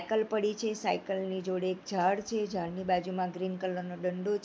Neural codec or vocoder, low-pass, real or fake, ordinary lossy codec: none; none; real; none